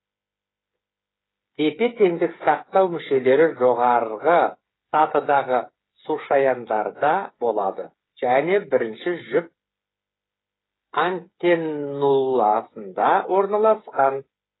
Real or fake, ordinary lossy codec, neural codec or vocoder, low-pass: fake; AAC, 16 kbps; codec, 16 kHz, 8 kbps, FreqCodec, smaller model; 7.2 kHz